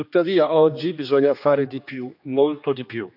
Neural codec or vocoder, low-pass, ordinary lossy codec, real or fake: codec, 16 kHz, 2 kbps, X-Codec, HuBERT features, trained on general audio; 5.4 kHz; none; fake